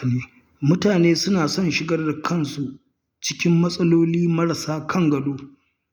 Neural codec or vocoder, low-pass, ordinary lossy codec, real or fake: none; none; none; real